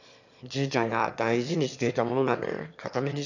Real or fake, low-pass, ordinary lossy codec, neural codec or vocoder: fake; 7.2 kHz; none; autoencoder, 22.05 kHz, a latent of 192 numbers a frame, VITS, trained on one speaker